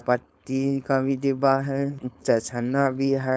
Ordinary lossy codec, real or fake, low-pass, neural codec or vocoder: none; fake; none; codec, 16 kHz, 4.8 kbps, FACodec